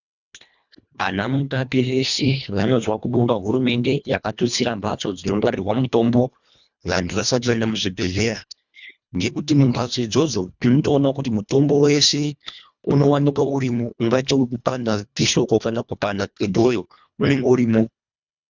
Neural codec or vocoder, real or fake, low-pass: codec, 24 kHz, 1.5 kbps, HILCodec; fake; 7.2 kHz